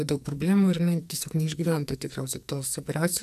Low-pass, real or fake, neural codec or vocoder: 14.4 kHz; fake; codec, 44.1 kHz, 2.6 kbps, SNAC